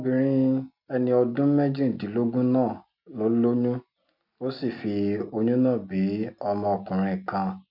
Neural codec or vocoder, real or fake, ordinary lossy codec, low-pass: none; real; none; 5.4 kHz